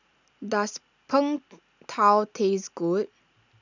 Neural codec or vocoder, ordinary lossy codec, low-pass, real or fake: none; none; 7.2 kHz; real